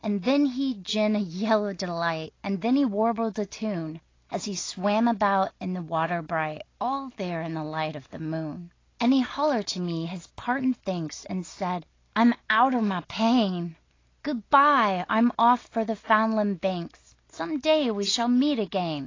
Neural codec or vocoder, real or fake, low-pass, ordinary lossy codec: none; real; 7.2 kHz; AAC, 32 kbps